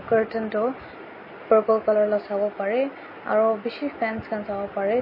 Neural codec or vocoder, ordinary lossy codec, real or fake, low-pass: none; MP3, 24 kbps; real; 5.4 kHz